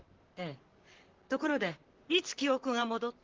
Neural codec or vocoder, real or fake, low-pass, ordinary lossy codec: vocoder, 44.1 kHz, 128 mel bands, Pupu-Vocoder; fake; 7.2 kHz; Opus, 16 kbps